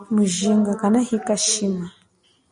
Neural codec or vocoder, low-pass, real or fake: none; 9.9 kHz; real